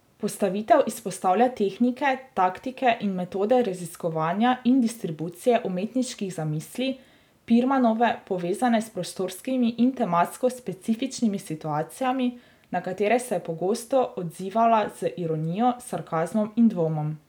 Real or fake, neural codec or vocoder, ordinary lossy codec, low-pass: real; none; none; 19.8 kHz